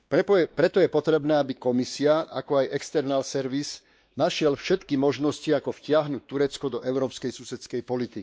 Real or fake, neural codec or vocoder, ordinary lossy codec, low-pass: fake; codec, 16 kHz, 2 kbps, X-Codec, WavLM features, trained on Multilingual LibriSpeech; none; none